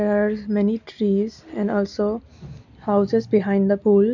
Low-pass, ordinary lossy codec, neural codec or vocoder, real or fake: 7.2 kHz; none; none; real